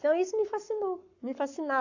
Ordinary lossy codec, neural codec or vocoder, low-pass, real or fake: AAC, 48 kbps; codec, 44.1 kHz, 7.8 kbps, Pupu-Codec; 7.2 kHz; fake